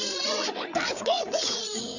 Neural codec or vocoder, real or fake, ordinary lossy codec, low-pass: codec, 16 kHz, 4 kbps, FreqCodec, larger model; fake; none; 7.2 kHz